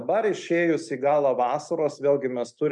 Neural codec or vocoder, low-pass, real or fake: none; 10.8 kHz; real